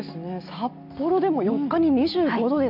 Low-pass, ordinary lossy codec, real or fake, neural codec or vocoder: 5.4 kHz; none; real; none